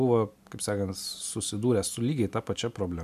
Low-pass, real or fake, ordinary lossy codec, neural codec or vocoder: 14.4 kHz; real; MP3, 96 kbps; none